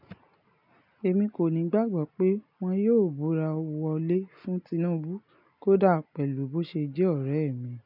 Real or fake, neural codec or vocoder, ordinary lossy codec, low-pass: real; none; none; 5.4 kHz